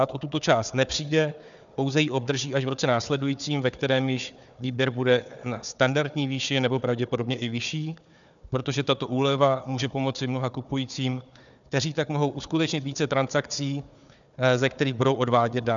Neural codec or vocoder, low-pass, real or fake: codec, 16 kHz, 4 kbps, FunCodec, trained on Chinese and English, 50 frames a second; 7.2 kHz; fake